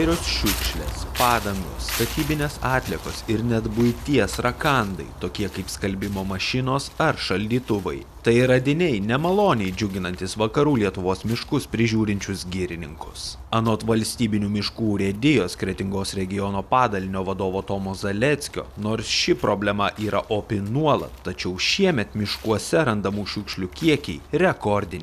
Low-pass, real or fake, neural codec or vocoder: 14.4 kHz; real; none